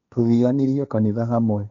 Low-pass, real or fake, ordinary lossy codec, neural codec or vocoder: 7.2 kHz; fake; none; codec, 16 kHz, 1.1 kbps, Voila-Tokenizer